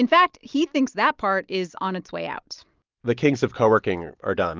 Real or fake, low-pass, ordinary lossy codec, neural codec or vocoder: real; 7.2 kHz; Opus, 32 kbps; none